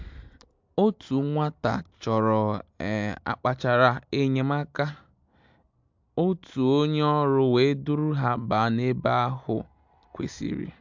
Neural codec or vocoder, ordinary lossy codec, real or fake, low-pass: none; MP3, 64 kbps; real; 7.2 kHz